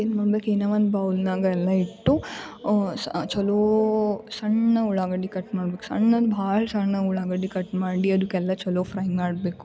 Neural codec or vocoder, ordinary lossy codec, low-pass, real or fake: none; none; none; real